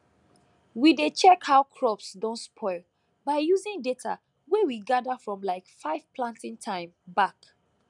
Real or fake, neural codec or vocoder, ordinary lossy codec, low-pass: real; none; none; 10.8 kHz